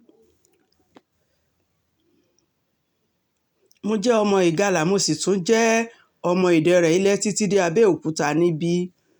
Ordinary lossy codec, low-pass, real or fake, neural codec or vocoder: none; 19.8 kHz; real; none